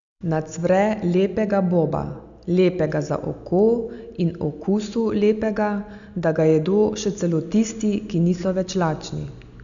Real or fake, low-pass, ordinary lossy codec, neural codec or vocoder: real; 7.2 kHz; none; none